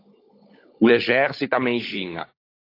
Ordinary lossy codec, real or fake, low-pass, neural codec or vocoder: AAC, 32 kbps; fake; 5.4 kHz; codec, 16 kHz, 16 kbps, FunCodec, trained on LibriTTS, 50 frames a second